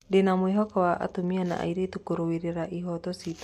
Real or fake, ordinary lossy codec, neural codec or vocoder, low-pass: real; MP3, 64 kbps; none; 14.4 kHz